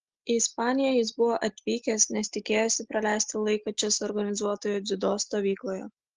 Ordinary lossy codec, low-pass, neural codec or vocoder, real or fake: Opus, 16 kbps; 7.2 kHz; none; real